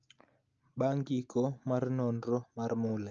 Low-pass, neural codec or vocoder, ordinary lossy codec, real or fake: 7.2 kHz; none; Opus, 24 kbps; real